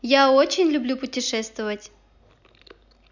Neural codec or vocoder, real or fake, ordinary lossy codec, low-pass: none; real; none; 7.2 kHz